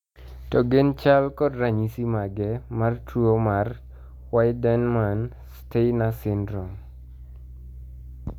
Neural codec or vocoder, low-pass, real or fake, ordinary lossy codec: none; 19.8 kHz; real; none